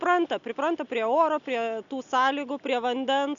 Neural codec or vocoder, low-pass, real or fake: none; 7.2 kHz; real